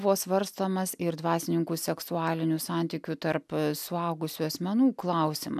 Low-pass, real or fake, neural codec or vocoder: 14.4 kHz; real; none